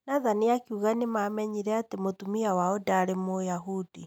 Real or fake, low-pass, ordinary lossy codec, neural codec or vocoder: real; 19.8 kHz; none; none